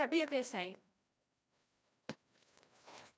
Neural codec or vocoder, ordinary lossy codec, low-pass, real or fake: codec, 16 kHz, 1 kbps, FreqCodec, larger model; none; none; fake